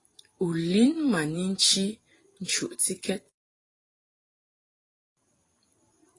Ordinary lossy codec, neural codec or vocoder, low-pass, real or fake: AAC, 32 kbps; vocoder, 44.1 kHz, 128 mel bands every 512 samples, BigVGAN v2; 10.8 kHz; fake